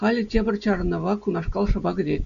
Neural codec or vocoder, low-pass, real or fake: none; 7.2 kHz; real